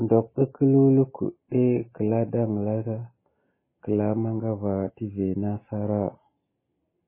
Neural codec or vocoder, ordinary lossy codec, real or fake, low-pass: none; MP3, 16 kbps; real; 3.6 kHz